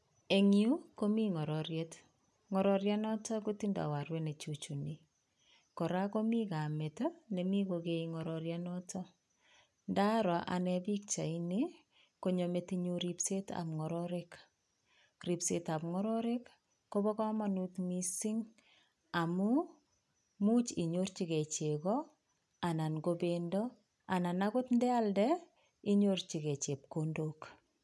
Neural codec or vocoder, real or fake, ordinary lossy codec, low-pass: none; real; none; none